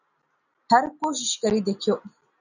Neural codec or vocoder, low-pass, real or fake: none; 7.2 kHz; real